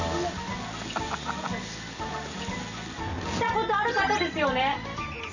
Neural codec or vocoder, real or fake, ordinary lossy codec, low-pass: none; real; none; 7.2 kHz